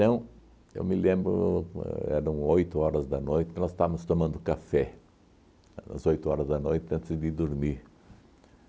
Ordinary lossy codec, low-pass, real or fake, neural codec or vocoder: none; none; real; none